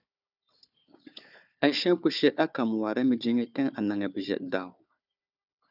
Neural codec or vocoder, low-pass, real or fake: codec, 16 kHz, 4 kbps, FunCodec, trained on Chinese and English, 50 frames a second; 5.4 kHz; fake